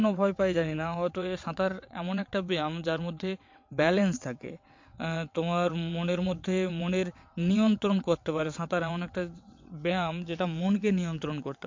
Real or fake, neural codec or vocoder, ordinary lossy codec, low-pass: fake; vocoder, 22.05 kHz, 80 mel bands, WaveNeXt; MP3, 48 kbps; 7.2 kHz